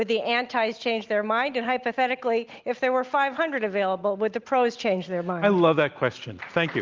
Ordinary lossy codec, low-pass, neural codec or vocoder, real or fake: Opus, 32 kbps; 7.2 kHz; none; real